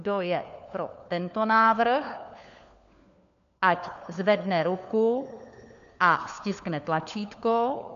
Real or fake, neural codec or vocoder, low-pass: fake; codec, 16 kHz, 4 kbps, FunCodec, trained on LibriTTS, 50 frames a second; 7.2 kHz